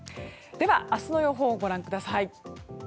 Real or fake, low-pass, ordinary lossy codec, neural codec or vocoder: real; none; none; none